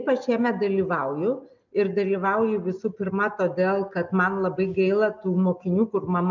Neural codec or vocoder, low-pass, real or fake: none; 7.2 kHz; real